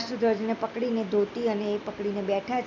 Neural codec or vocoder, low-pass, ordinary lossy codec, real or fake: none; 7.2 kHz; none; real